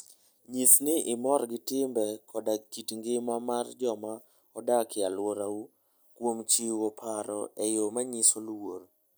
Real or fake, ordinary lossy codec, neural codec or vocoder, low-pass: real; none; none; none